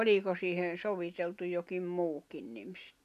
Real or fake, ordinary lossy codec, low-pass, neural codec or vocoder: real; none; 14.4 kHz; none